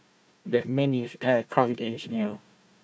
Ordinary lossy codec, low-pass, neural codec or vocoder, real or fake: none; none; codec, 16 kHz, 1 kbps, FunCodec, trained on Chinese and English, 50 frames a second; fake